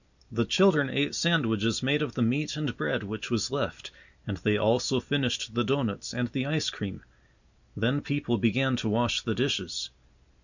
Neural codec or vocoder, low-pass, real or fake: none; 7.2 kHz; real